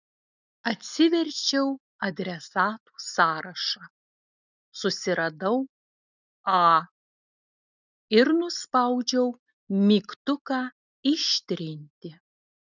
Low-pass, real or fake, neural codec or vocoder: 7.2 kHz; real; none